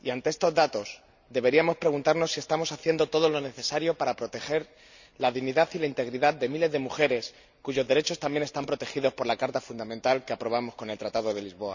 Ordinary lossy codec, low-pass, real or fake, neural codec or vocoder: none; 7.2 kHz; real; none